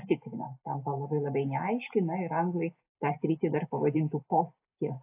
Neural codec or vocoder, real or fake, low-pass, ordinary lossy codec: none; real; 3.6 kHz; AAC, 32 kbps